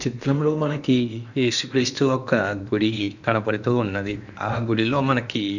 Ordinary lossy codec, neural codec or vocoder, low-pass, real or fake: none; codec, 16 kHz in and 24 kHz out, 0.8 kbps, FocalCodec, streaming, 65536 codes; 7.2 kHz; fake